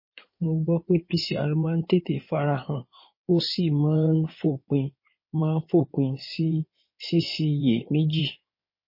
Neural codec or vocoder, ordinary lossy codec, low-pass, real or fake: codec, 16 kHz in and 24 kHz out, 2.2 kbps, FireRedTTS-2 codec; MP3, 24 kbps; 5.4 kHz; fake